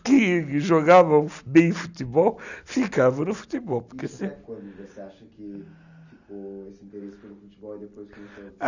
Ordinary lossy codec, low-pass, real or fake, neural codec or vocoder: none; 7.2 kHz; real; none